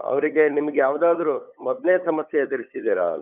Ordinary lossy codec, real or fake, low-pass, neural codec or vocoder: none; fake; 3.6 kHz; codec, 16 kHz, 8 kbps, FunCodec, trained on LibriTTS, 25 frames a second